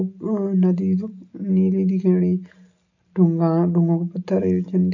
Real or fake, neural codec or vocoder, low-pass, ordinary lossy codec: real; none; 7.2 kHz; none